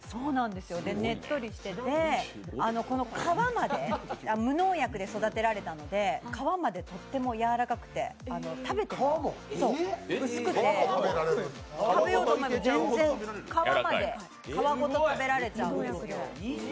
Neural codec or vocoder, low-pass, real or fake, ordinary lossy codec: none; none; real; none